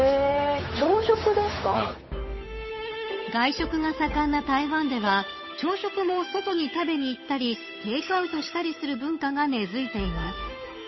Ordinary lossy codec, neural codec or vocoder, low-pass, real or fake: MP3, 24 kbps; codec, 16 kHz, 8 kbps, FunCodec, trained on Chinese and English, 25 frames a second; 7.2 kHz; fake